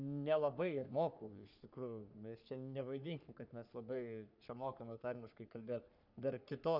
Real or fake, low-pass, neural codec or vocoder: fake; 5.4 kHz; codec, 44.1 kHz, 3.4 kbps, Pupu-Codec